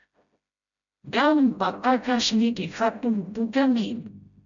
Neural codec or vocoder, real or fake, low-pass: codec, 16 kHz, 0.5 kbps, FreqCodec, smaller model; fake; 7.2 kHz